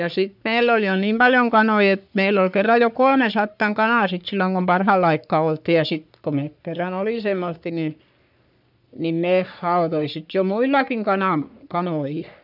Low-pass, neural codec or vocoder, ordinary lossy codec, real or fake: 5.4 kHz; codec, 44.1 kHz, 3.4 kbps, Pupu-Codec; none; fake